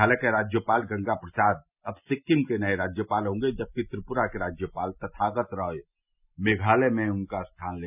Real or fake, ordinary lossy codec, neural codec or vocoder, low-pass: real; none; none; 3.6 kHz